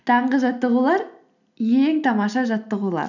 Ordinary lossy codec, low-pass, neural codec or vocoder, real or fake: none; 7.2 kHz; none; real